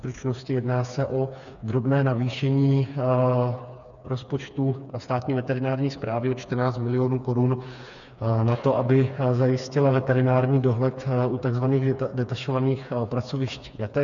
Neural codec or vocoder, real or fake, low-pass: codec, 16 kHz, 4 kbps, FreqCodec, smaller model; fake; 7.2 kHz